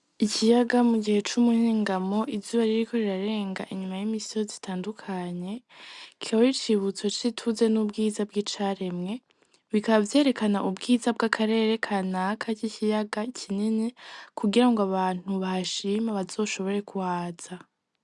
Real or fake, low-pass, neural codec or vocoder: real; 10.8 kHz; none